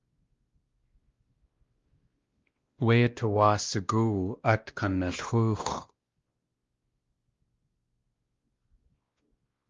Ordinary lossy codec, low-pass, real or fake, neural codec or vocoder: Opus, 32 kbps; 7.2 kHz; fake; codec, 16 kHz, 1 kbps, X-Codec, WavLM features, trained on Multilingual LibriSpeech